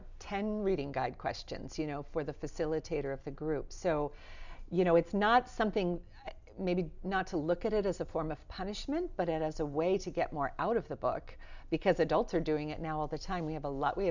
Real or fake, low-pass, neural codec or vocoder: real; 7.2 kHz; none